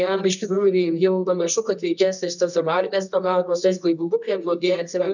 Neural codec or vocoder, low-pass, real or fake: codec, 24 kHz, 0.9 kbps, WavTokenizer, medium music audio release; 7.2 kHz; fake